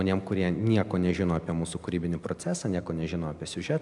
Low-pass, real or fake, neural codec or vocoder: 10.8 kHz; real; none